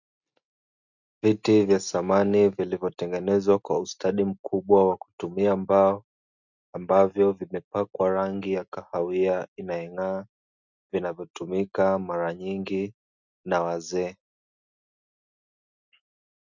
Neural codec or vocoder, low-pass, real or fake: none; 7.2 kHz; real